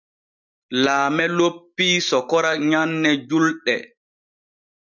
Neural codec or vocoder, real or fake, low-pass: none; real; 7.2 kHz